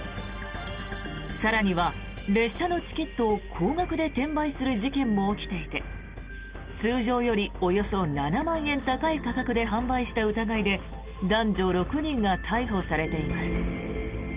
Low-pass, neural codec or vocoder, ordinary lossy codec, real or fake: 3.6 kHz; none; Opus, 32 kbps; real